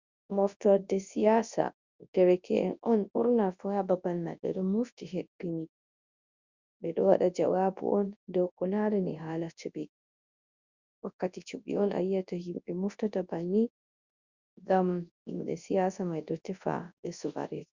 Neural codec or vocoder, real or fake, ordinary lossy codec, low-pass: codec, 24 kHz, 0.9 kbps, WavTokenizer, large speech release; fake; Opus, 64 kbps; 7.2 kHz